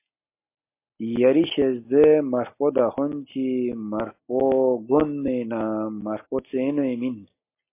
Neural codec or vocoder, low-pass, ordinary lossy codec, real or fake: none; 3.6 kHz; MP3, 24 kbps; real